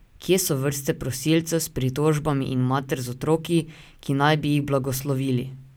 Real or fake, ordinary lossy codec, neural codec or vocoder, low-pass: real; none; none; none